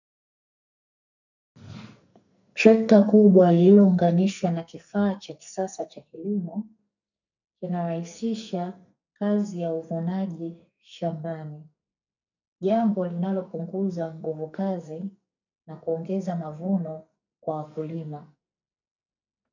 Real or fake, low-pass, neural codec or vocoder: fake; 7.2 kHz; codec, 44.1 kHz, 2.6 kbps, SNAC